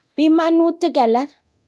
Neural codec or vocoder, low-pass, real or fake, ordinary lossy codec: codec, 24 kHz, 0.9 kbps, DualCodec; none; fake; none